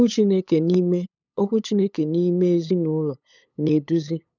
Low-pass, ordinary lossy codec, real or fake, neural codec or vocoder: 7.2 kHz; none; fake; codec, 16 kHz, 8 kbps, FunCodec, trained on LibriTTS, 25 frames a second